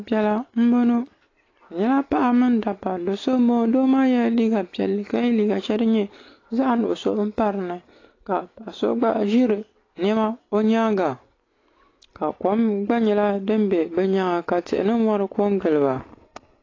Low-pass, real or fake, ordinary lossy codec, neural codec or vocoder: 7.2 kHz; real; AAC, 32 kbps; none